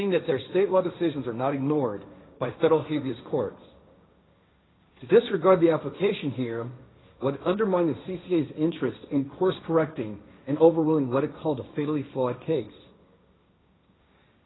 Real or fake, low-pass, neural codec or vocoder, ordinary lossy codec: fake; 7.2 kHz; codec, 16 kHz, 1.1 kbps, Voila-Tokenizer; AAC, 16 kbps